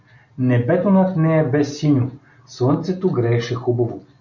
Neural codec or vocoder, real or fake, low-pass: none; real; 7.2 kHz